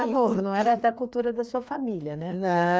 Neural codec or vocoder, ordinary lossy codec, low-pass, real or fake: codec, 16 kHz, 2 kbps, FreqCodec, larger model; none; none; fake